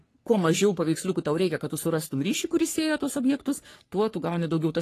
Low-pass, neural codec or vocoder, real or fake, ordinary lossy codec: 14.4 kHz; codec, 44.1 kHz, 3.4 kbps, Pupu-Codec; fake; AAC, 48 kbps